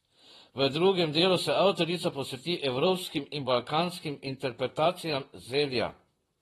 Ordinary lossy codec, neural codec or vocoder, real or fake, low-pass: AAC, 32 kbps; vocoder, 44.1 kHz, 128 mel bands, Pupu-Vocoder; fake; 19.8 kHz